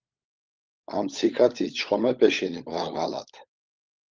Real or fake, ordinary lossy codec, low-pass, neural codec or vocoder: fake; Opus, 32 kbps; 7.2 kHz; codec, 16 kHz, 16 kbps, FunCodec, trained on LibriTTS, 50 frames a second